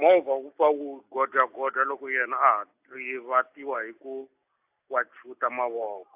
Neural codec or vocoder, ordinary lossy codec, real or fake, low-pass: none; AAC, 32 kbps; real; 3.6 kHz